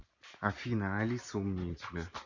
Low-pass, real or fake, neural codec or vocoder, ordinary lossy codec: 7.2 kHz; real; none; none